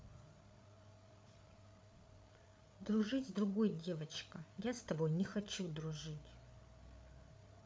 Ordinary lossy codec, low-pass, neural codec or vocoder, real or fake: none; none; codec, 16 kHz, 8 kbps, FreqCodec, larger model; fake